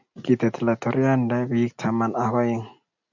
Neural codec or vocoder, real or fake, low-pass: none; real; 7.2 kHz